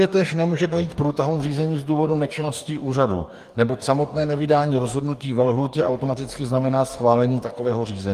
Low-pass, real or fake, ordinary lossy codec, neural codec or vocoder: 14.4 kHz; fake; Opus, 32 kbps; codec, 44.1 kHz, 2.6 kbps, DAC